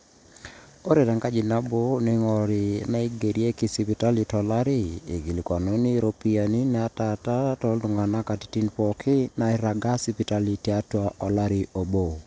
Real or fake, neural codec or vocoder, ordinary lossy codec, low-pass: real; none; none; none